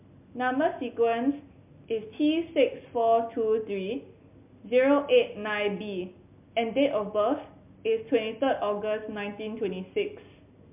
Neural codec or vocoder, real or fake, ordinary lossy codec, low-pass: none; real; MP3, 32 kbps; 3.6 kHz